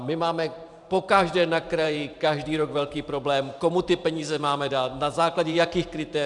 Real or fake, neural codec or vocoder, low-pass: fake; vocoder, 44.1 kHz, 128 mel bands every 512 samples, BigVGAN v2; 10.8 kHz